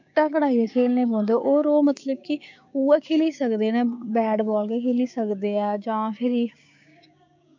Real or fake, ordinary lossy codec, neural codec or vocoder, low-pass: fake; MP3, 64 kbps; codec, 16 kHz, 6 kbps, DAC; 7.2 kHz